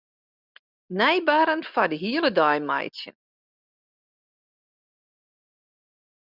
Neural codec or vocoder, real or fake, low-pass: none; real; 5.4 kHz